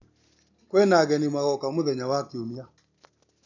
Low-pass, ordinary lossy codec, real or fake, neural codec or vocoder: 7.2 kHz; none; real; none